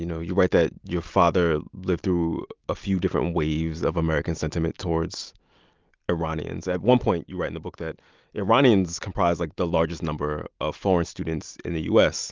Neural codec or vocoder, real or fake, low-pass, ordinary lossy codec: none; real; 7.2 kHz; Opus, 24 kbps